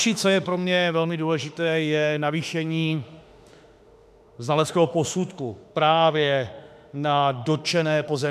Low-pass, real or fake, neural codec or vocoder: 14.4 kHz; fake; autoencoder, 48 kHz, 32 numbers a frame, DAC-VAE, trained on Japanese speech